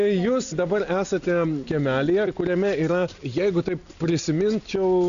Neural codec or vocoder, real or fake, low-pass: none; real; 7.2 kHz